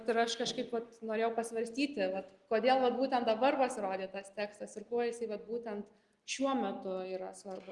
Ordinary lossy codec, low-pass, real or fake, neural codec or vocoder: Opus, 24 kbps; 10.8 kHz; real; none